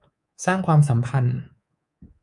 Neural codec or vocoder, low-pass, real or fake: codec, 24 kHz, 3.1 kbps, DualCodec; 10.8 kHz; fake